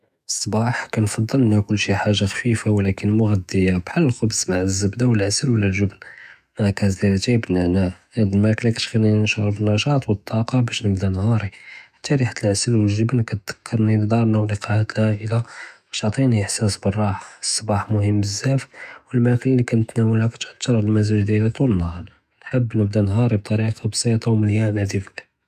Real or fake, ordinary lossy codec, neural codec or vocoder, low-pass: fake; none; autoencoder, 48 kHz, 128 numbers a frame, DAC-VAE, trained on Japanese speech; 14.4 kHz